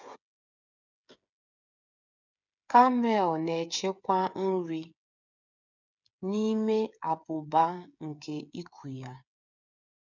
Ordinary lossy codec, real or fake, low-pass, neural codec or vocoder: none; fake; 7.2 kHz; codec, 16 kHz, 8 kbps, FreqCodec, smaller model